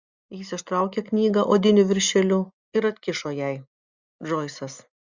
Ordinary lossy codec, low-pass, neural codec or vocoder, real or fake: Opus, 64 kbps; 7.2 kHz; none; real